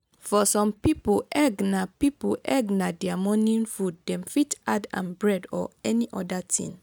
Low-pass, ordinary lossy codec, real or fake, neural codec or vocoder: none; none; real; none